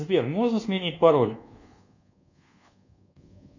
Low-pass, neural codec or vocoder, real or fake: 7.2 kHz; codec, 24 kHz, 1.2 kbps, DualCodec; fake